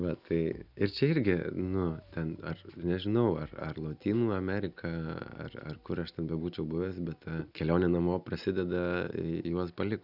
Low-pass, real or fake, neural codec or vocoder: 5.4 kHz; real; none